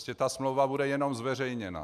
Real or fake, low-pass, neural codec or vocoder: real; 14.4 kHz; none